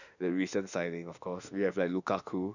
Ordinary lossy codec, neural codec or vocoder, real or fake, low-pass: none; autoencoder, 48 kHz, 32 numbers a frame, DAC-VAE, trained on Japanese speech; fake; 7.2 kHz